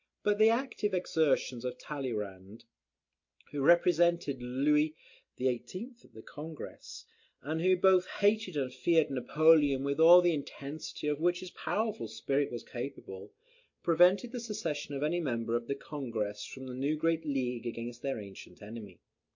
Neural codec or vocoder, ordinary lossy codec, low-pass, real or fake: none; MP3, 48 kbps; 7.2 kHz; real